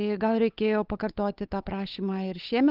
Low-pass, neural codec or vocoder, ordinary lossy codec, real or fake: 5.4 kHz; codec, 16 kHz, 8 kbps, FunCodec, trained on Chinese and English, 25 frames a second; Opus, 32 kbps; fake